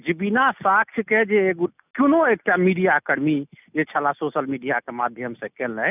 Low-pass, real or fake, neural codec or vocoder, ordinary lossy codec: 3.6 kHz; real; none; none